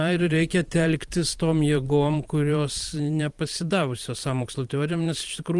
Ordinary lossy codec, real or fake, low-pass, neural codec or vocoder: Opus, 24 kbps; fake; 10.8 kHz; vocoder, 44.1 kHz, 128 mel bands every 512 samples, BigVGAN v2